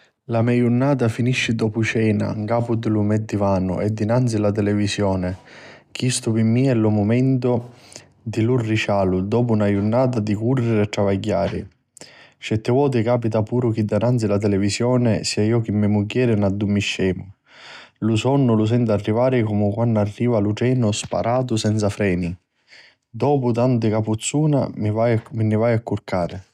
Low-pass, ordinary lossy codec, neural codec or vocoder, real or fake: 14.4 kHz; none; none; real